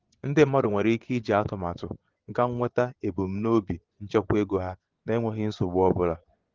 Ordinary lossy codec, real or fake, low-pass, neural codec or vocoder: Opus, 16 kbps; fake; 7.2 kHz; vocoder, 24 kHz, 100 mel bands, Vocos